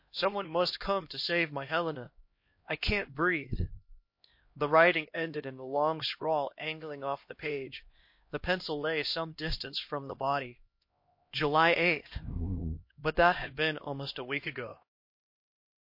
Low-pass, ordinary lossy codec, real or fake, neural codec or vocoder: 5.4 kHz; MP3, 32 kbps; fake; codec, 16 kHz, 1 kbps, X-Codec, HuBERT features, trained on LibriSpeech